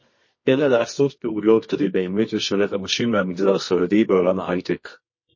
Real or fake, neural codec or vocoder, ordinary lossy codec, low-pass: fake; codec, 24 kHz, 0.9 kbps, WavTokenizer, medium music audio release; MP3, 32 kbps; 7.2 kHz